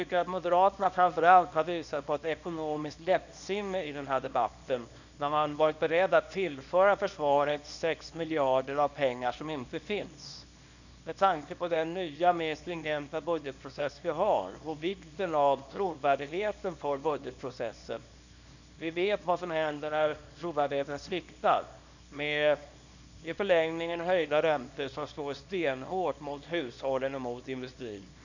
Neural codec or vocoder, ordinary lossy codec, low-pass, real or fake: codec, 24 kHz, 0.9 kbps, WavTokenizer, small release; none; 7.2 kHz; fake